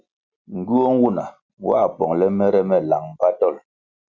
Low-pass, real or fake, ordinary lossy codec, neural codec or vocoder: 7.2 kHz; real; Opus, 64 kbps; none